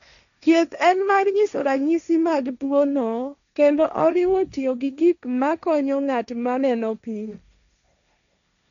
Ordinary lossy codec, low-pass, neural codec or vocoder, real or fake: none; 7.2 kHz; codec, 16 kHz, 1.1 kbps, Voila-Tokenizer; fake